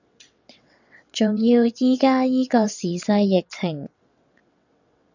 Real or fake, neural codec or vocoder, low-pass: fake; vocoder, 22.05 kHz, 80 mel bands, WaveNeXt; 7.2 kHz